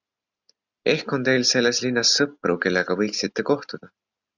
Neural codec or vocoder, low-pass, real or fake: none; 7.2 kHz; real